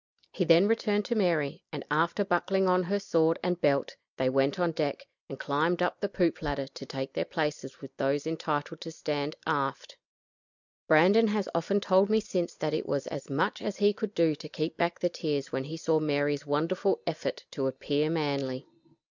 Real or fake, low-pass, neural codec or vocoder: real; 7.2 kHz; none